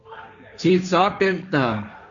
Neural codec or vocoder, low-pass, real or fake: codec, 16 kHz, 1.1 kbps, Voila-Tokenizer; 7.2 kHz; fake